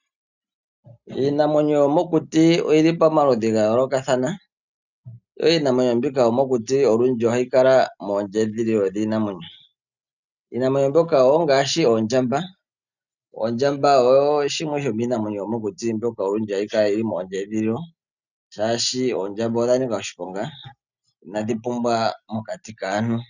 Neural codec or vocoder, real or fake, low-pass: none; real; 7.2 kHz